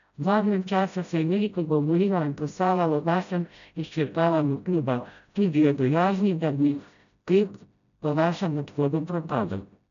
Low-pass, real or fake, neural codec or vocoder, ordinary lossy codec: 7.2 kHz; fake; codec, 16 kHz, 0.5 kbps, FreqCodec, smaller model; none